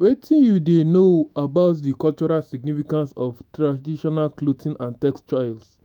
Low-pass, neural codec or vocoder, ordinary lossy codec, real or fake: 19.8 kHz; autoencoder, 48 kHz, 128 numbers a frame, DAC-VAE, trained on Japanese speech; none; fake